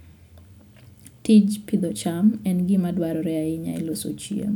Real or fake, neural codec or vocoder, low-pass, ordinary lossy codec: real; none; 19.8 kHz; none